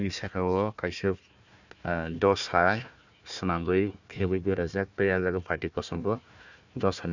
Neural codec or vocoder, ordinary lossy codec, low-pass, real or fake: codec, 16 kHz, 1 kbps, FunCodec, trained on Chinese and English, 50 frames a second; none; 7.2 kHz; fake